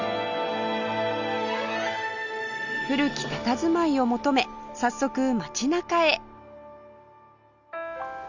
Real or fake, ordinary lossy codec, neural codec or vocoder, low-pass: real; none; none; 7.2 kHz